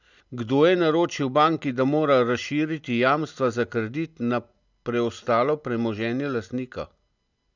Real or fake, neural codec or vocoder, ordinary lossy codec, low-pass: real; none; none; 7.2 kHz